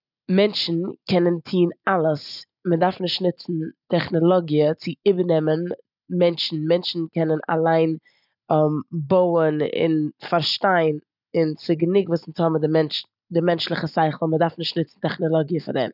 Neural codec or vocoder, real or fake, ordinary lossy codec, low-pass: none; real; none; 5.4 kHz